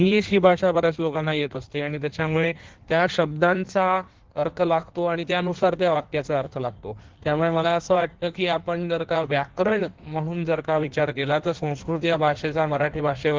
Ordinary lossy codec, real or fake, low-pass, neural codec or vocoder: Opus, 16 kbps; fake; 7.2 kHz; codec, 16 kHz in and 24 kHz out, 1.1 kbps, FireRedTTS-2 codec